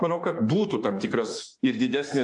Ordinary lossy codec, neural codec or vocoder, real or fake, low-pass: AAC, 64 kbps; autoencoder, 48 kHz, 32 numbers a frame, DAC-VAE, trained on Japanese speech; fake; 10.8 kHz